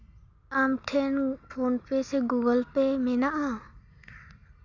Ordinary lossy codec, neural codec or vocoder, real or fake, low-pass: none; none; real; 7.2 kHz